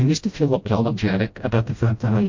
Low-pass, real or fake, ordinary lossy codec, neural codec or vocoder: 7.2 kHz; fake; MP3, 48 kbps; codec, 16 kHz, 0.5 kbps, FreqCodec, smaller model